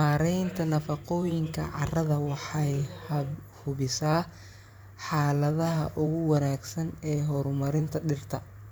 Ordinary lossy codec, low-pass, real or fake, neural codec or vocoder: none; none; fake; vocoder, 44.1 kHz, 128 mel bands every 512 samples, BigVGAN v2